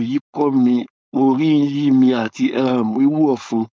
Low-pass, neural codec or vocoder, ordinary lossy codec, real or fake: none; codec, 16 kHz, 4.8 kbps, FACodec; none; fake